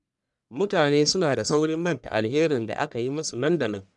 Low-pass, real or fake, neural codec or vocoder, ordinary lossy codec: 10.8 kHz; fake; codec, 44.1 kHz, 1.7 kbps, Pupu-Codec; none